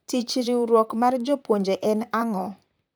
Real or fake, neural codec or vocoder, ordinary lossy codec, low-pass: fake; vocoder, 44.1 kHz, 128 mel bands, Pupu-Vocoder; none; none